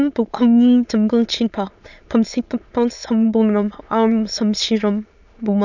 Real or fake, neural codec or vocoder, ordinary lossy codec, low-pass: fake; autoencoder, 22.05 kHz, a latent of 192 numbers a frame, VITS, trained on many speakers; none; 7.2 kHz